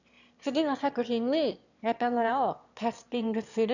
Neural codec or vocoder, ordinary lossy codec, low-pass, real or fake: autoencoder, 22.05 kHz, a latent of 192 numbers a frame, VITS, trained on one speaker; none; 7.2 kHz; fake